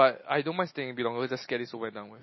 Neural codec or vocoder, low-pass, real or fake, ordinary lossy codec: none; 7.2 kHz; real; MP3, 24 kbps